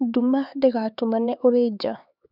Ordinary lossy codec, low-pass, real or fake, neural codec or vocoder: none; 5.4 kHz; fake; codec, 16 kHz, 4 kbps, X-Codec, HuBERT features, trained on LibriSpeech